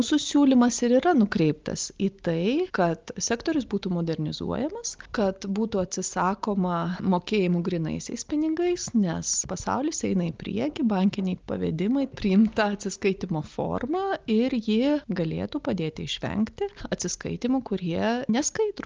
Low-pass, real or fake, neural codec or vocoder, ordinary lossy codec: 7.2 kHz; real; none; Opus, 24 kbps